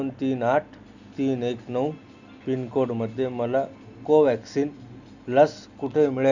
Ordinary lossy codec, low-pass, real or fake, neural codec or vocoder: none; 7.2 kHz; real; none